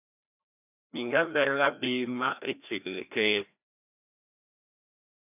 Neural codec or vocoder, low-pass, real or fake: codec, 16 kHz, 1 kbps, FreqCodec, larger model; 3.6 kHz; fake